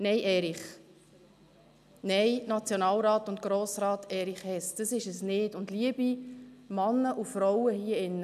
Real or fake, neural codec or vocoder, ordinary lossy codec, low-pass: real; none; none; 14.4 kHz